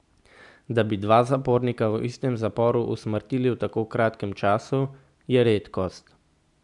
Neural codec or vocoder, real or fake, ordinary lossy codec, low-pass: none; real; none; 10.8 kHz